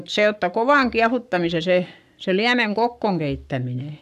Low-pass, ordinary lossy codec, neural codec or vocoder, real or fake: 14.4 kHz; none; codec, 44.1 kHz, 7.8 kbps, Pupu-Codec; fake